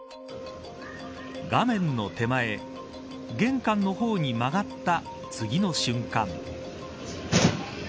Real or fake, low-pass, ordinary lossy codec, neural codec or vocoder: real; none; none; none